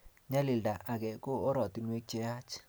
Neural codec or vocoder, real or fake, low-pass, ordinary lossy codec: none; real; none; none